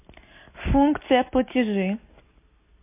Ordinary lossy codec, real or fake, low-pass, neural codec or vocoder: MP3, 24 kbps; real; 3.6 kHz; none